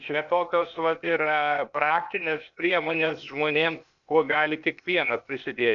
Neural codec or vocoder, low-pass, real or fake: codec, 16 kHz, 0.8 kbps, ZipCodec; 7.2 kHz; fake